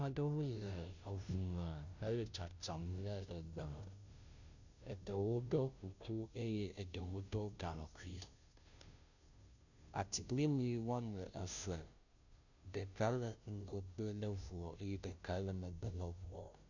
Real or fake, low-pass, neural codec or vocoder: fake; 7.2 kHz; codec, 16 kHz, 0.5 kbps, FunCodec, trained on Chinese and English, 25 frames a second